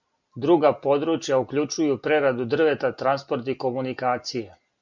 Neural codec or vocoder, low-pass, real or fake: none; 7.2 kHz; real